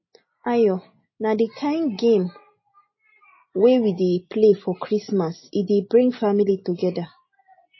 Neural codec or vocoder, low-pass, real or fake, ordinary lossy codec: none; 7.2 kHz; real; MP3, 24 kbps